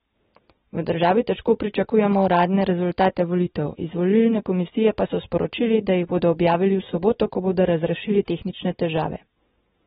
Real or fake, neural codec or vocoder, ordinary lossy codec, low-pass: real; none; AAC, 16 kbps; 19.8 kHz